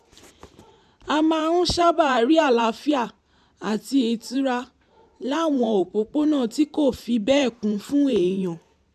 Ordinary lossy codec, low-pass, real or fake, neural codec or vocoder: none; 14.4 kHz; fake; vocoder, 44.1 kHz, 128 mel bands every 512 samples, BigVGAN v2